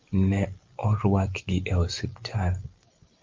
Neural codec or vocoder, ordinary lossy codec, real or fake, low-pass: none; Opus, 32 kbps; real; 7.2 kHz